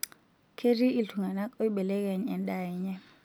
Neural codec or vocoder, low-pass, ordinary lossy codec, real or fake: none; none; none; real